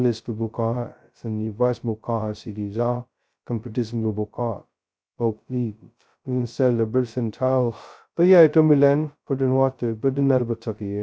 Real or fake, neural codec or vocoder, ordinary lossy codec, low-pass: fake; codec, 16 kHz, 0.2 kbps, FocalCodec; none; none